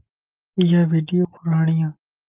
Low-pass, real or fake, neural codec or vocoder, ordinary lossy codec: 3.6 kHz; real; none; Opus, 24 kbps